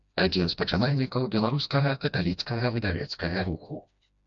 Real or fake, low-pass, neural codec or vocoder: fake; 7.2 kHz; codec, 16 kHz, 1 kbps, FreqCodec, smaller model